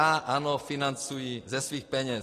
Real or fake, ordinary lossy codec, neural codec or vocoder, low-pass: real; AAC, 48 kbps; none; 14.4 kHz